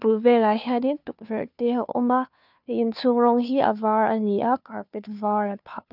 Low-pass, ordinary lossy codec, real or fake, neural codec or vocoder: 5.4 kHz; none; fake; codec, 16 kHz, 2 kbps, FunCodec, trained on Chinese and English, 25 frames a second